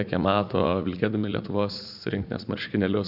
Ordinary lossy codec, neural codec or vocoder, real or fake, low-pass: AAC, 48 kbps; vocoder, 44.1 kHz, 80 mel bands, Vocos; fake; 5.4 kHz